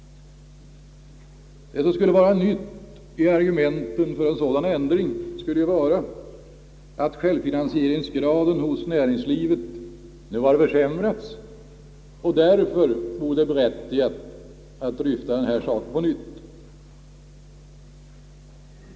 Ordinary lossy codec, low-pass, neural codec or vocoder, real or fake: none; none; none; real